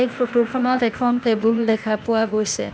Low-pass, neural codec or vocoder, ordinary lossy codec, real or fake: none; codec, 16 kHz, 0.8 kbps, ZipCodec; none; fake